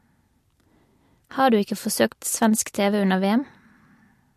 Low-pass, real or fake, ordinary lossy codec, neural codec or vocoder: 14.4 kHz; real; MP3, 64 kbps; none